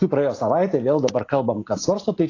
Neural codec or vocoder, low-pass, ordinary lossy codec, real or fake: none; 7.2 kHz; AAC, 32 kbps; real